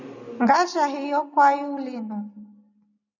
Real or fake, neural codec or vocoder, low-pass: real; none; 7.2 kHz